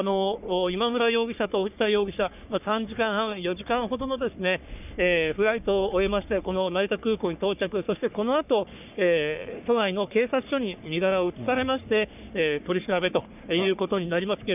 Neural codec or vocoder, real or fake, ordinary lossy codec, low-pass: codec, 44.1 kHz, 3.4 kbps, Pupu-Codec; fake; none; 3.6 kHz